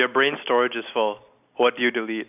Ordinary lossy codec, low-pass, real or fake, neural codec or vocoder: none; 3.6 kHz; real; none